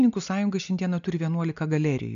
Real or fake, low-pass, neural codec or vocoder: real; 7.2 kHz; none